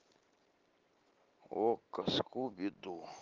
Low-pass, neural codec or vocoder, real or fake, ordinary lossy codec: 7.2 kHz; none; real; Opus, 16 kbps